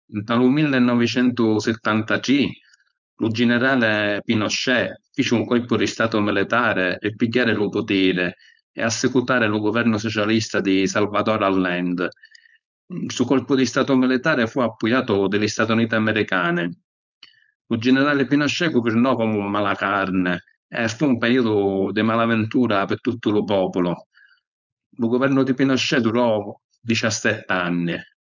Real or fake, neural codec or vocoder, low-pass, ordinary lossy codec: fake; codec, 16 kHz, 4.8 kbps, FACodec; 7.2 kHz; none